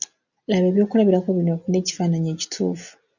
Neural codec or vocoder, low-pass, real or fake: none; 7.2 kHz; real